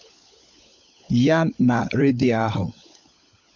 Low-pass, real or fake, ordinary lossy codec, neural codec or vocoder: 7.2 kHz; fake; MP3, 64 kbps; codec, 16 kHz, 16 kbps, FunCodec, trained on LibriTTS, 50 frames a second